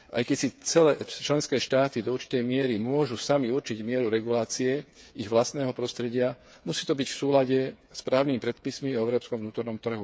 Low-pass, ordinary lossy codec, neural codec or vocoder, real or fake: none; none; codec, 16 kHz, 8 kbps, FreqCodec, smaller model; fake